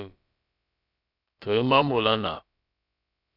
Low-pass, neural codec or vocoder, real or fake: 5.4 kHz; codec, 16 kHz, about 1 kbps, DyCAST, with the encoder's durations; fake